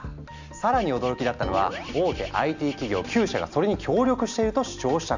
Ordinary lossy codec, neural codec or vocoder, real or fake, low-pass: none; none; real; 7.2 kHz